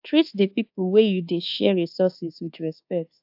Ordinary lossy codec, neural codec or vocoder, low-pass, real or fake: AAC, 48 kbps; codec, 24 kHz, 1.2 kbps, DualCodec; 5.4 kHz; fake